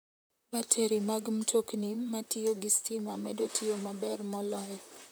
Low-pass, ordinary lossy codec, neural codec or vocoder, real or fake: none; none; vocoder, 44.1 kHz, 128 mel bands, Pupu-Vocoder; fake